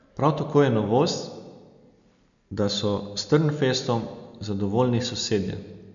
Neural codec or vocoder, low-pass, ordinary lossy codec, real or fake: none; 7.2 kHz; none; real